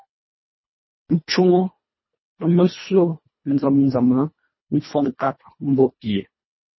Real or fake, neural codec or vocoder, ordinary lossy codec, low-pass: fake; codec, 24 kHz, 1.5 kbps, HILCodec; MP3, 24 kbps; 7.2 kHz